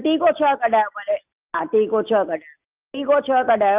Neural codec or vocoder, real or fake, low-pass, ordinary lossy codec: none; real; 3.6 kHz; Opus, 24 kbps